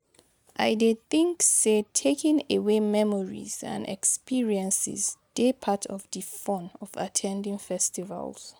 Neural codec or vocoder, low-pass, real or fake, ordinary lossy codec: none; none; real; none